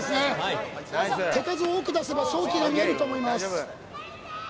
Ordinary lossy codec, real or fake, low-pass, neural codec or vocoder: none; real; none; none